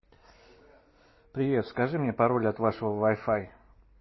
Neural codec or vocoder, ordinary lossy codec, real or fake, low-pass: none; MP3, 24 kbps; real; 7.2 kHz